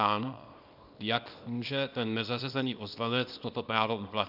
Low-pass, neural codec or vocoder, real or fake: 5.4 kHz; codec, 24 kHz, 0.9 kbps, WavTokenizer, small release; fake